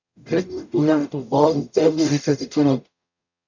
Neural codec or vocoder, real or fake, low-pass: codec, 44.1 kHz, 0.9 kbps, DAC; fake; 7.2 kHz